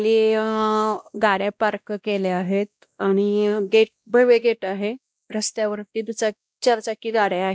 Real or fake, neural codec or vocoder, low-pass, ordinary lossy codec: fake; codec, 16 kHz, 1 kbps, X-Codec, WavLM features, trained on Multilingual LibriSpeech; none; none